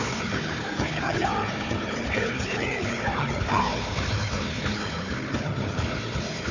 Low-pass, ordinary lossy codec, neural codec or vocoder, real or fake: 7.2 kHz; none; codec, 16 kHz, 4 kbps, FunCodec, trained on Chinese and English, 50 frames a second; fake